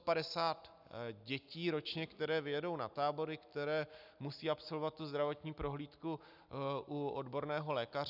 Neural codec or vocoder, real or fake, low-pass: none; real; 5.4 kHz